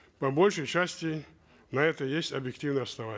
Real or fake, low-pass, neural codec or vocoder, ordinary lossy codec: real; none; none; none